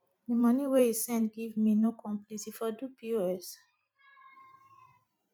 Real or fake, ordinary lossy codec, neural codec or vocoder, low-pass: fake; none; vocoder, 44.1 kHz, 128 mel bands every 512 samples, BigVGAN v2; 19.8 kHz